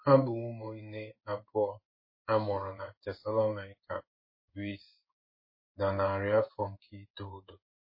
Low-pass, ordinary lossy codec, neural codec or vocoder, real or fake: 5.4 kHz; MP3, 24 kbps; codec, 16 kHz in and 24 kHz out, 1 kbps, XY-Tokenizer; fake